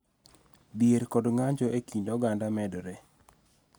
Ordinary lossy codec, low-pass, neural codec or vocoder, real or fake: none; none; none; real